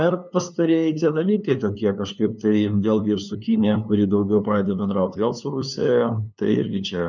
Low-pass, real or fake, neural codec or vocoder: 7.2 kHz; fake; codec, 16 kHz, 2 kbps, FunCodec, trained on LibriTTS, 25 frames a second